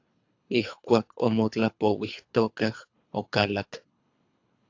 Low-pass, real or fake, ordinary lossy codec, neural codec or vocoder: 7.2 kHz; fake; AAC, 48 kbps; codec, 24 kHz, 3 kbps, HILCodec